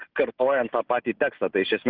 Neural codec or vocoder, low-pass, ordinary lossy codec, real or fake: none; 5.4 kHz; Opus, 24 kbps; real